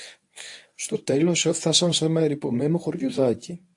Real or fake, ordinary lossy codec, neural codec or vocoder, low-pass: fake; AAC, 64 kbps; codec, 24 kHz, 0.9 kbps, WavTokenizer, medium speech release version 1; 10.8 kHz